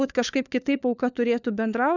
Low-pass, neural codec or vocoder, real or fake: 7.2 kHz; codec, 16 kHz, 4 kbps, FunCodec, trained on LibriTTS, 50 frames a second; fake